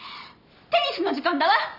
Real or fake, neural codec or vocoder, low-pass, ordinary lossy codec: real; none; 5.4 kHz; none